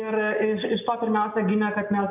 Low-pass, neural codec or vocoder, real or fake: 3.6 kHz; none; real